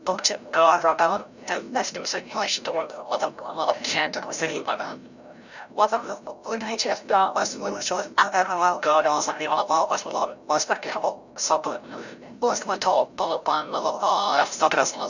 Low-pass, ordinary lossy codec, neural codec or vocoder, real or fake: 7.2 kHz; none; codec, 16 kHz, 0.5 kbps, FreqCodec, larger model; fake